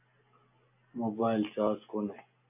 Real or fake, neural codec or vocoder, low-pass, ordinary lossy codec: real; none; 3.6 kHz; AAC, 32 kbps